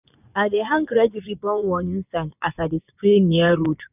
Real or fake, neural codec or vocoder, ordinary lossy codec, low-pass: fake; vocoder, 24 kHz, 100 mel bands, Vocos; none; 3.6 kHz